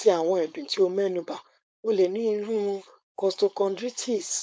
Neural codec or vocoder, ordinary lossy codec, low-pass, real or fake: codec, 16 kHz, 4.8 kbps, FACodec; none; none; fake